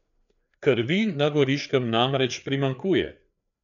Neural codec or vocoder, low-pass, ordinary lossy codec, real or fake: codec, 16 kHz, 4 kbps, FreqCodec, larger model; 7.2 kHz; none; fake